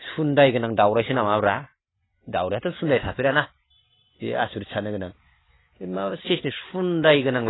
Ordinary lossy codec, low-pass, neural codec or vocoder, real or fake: AAC, 16 kbps; 7.2 kHz; none; real